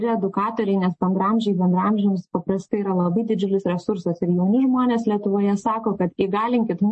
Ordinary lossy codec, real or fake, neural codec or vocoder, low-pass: MP3, 32 kbps; real; none; 10.8 kHz